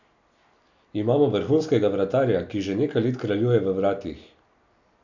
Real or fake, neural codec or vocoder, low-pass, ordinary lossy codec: real; none; 7.2 kHz; none